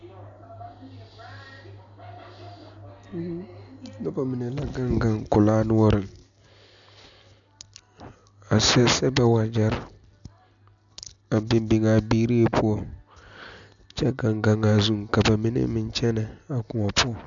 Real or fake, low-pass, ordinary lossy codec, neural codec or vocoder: real; 7.2 kHz; MP3, 96 kbps; none